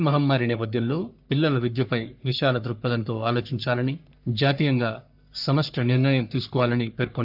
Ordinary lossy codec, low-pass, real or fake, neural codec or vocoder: none; 5.4 kHz; fake; codec, 44.1 kHz, 3.4 kbps, Pupu-Codec